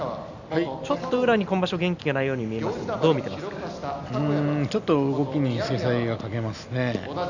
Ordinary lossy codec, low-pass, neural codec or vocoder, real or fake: none; 7.2 kHz; none; real